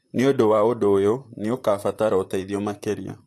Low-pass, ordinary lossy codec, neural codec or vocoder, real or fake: 14.4 kHz; AAC, 64 kbps; vocoder, 44.1 kHz, 128 mel bands, Pupu-Vocoder; fake